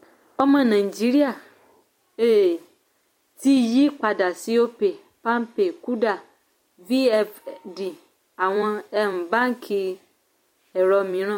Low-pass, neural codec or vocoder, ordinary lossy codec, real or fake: 19.8 kHz; vocoder, 44.1 kHz, 128 mel bands every 256 samples, BigVGAN v2; MP3, 64 kbps; fake